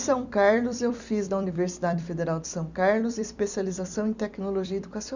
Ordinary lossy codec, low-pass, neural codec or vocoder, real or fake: none; 7.2 kHz; vocoder, 44.1 kHz, 128 mel bands every 256 samples, BigVGAN v2; fake